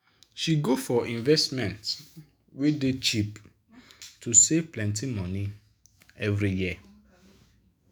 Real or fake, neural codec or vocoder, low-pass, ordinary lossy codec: fake; autoencoder, 48 kHz, 128 numbers a frame, DAC-VAE, trained on Japanese speech; none; none